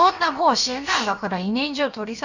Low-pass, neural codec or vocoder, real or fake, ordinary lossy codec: 7.2 kHz; codec, 16 kHz, about 1 kbps, DyCAST, with the encoder's durations; fake; none